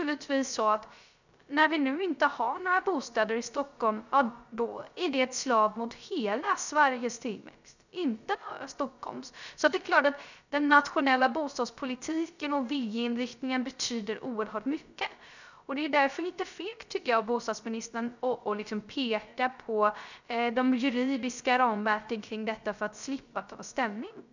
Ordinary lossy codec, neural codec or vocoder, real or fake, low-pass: none; codec, 16 kHz, 0.3 kbps, FocalCodec; fake; 7.2 kHz